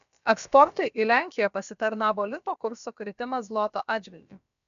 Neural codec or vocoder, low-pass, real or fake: codec, 16 kHz, about 1 kbps, DyCAST, with the encoder's durations; 7.2 kHz; fake